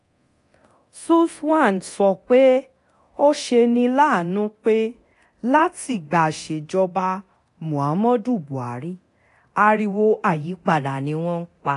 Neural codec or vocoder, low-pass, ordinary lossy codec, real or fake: codec, 24 kHz, 0.9 kbps, DualCodec; 10.8 kHz; AAC, 48 kbps; fake